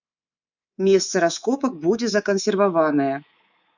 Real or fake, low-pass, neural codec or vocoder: fake; 7.2 kHz; codec, 24 kHz, 3.1 kbps, DualCodec